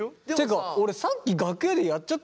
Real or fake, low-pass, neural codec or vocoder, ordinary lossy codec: real; none; none; none